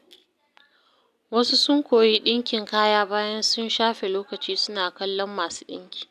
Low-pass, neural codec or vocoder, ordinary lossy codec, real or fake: 14.4 kHz; none; none; real